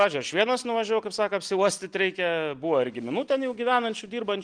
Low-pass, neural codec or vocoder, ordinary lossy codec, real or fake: 9.9 kHz; none; Opus, 24 kbps; real